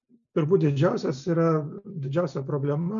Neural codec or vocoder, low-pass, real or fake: none; 7.2 kHz; real